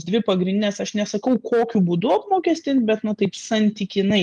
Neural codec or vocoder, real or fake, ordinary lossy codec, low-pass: none; real; Opus, 32 kbps; 10.8 kHz